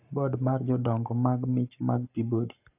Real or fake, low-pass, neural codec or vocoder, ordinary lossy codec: fake; 3.6 kHz; codec, 44.1 kHz, 7.8 kbps, Pupu-Codec; AAC, 32 kbps